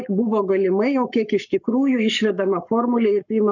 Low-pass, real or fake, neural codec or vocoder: 7.2 kHz; fake; codec, 44.1 kHz, 7.8 kbps, Pupu-Codec